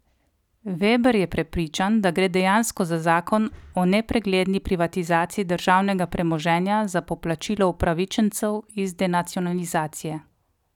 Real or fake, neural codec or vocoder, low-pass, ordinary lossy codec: real; none; 19.8 kHz; none